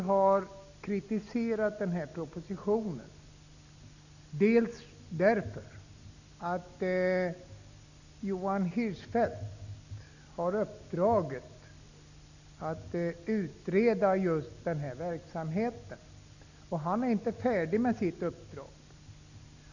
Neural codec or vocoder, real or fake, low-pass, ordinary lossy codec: none; real; 7.2 kHz; none